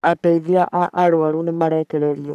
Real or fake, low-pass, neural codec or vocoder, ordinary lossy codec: fake; 14.4 kHz; codec, 44.1 kHz, 3.4 kbps, Pupu-Codec; none